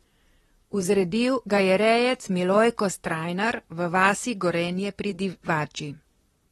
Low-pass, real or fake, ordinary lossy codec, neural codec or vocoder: 19.8 kHz; fake; AAC, 32 kbps; vocoder, 44.1 kHz, 128 mel bands, Pupu-Vocoder